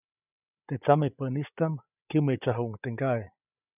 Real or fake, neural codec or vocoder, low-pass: fake; codec, 16 kHz, 8 kbps, FreqCodec, larger model; 3.6 kHz